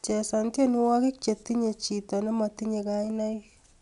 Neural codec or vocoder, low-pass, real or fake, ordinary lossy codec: none; 10.8 kHz; real; none